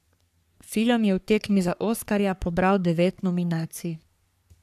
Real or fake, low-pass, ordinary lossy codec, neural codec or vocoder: fake; 14.4 kHz; none; codec, 44.1 kHz, 3.4 kbps, Pupu-Codec